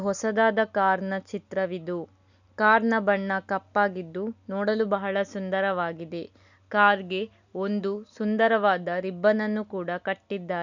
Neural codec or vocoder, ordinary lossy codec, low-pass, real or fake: none; none; 7.2 kHz; real